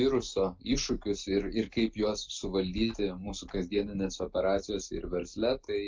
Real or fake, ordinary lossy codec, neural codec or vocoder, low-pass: real; Opus, 24 kbps; none; 7.2 kHz